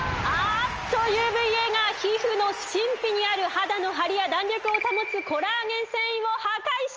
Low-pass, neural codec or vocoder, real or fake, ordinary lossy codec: 7.2 kHz; none; real; Opus, 24 kbps